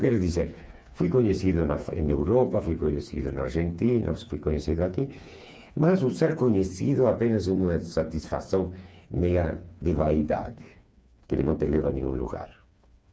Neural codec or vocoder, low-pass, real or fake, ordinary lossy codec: codec, 16 kHz, 4 kbps, FreqCodec, smaller model; none; fake; none